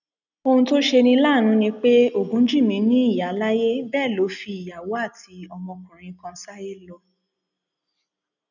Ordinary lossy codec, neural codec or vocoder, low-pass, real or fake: none; none; 7.2 kHz; real